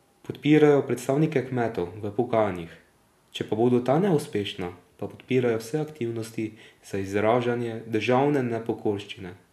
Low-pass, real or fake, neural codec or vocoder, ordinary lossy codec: 14.4 kHz; real; none; none